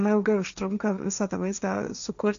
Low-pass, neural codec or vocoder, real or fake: 7.2 kHz; codec, 16 kHz, 1.1 kbps, Voila-Tokenizer; fake